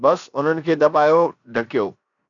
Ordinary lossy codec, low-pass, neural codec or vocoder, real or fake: MP3, 96 kbps; 7.2 kHz; codec, 16 kHz, 0.7 kbps, FocalCodec; fake